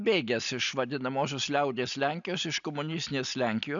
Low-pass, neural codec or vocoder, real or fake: 7.2 kHz; none; real